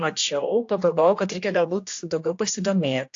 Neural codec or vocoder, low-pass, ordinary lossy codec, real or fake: codec, 16 kHz, 1 kbps, X-Codec, HuBERT features, trained on general audio; 7.2 kHz; AAC, 48 kbps; fake